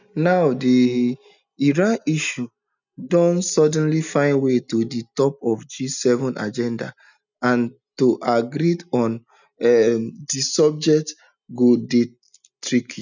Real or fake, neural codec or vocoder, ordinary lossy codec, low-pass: real; none; none; 7.2 kHz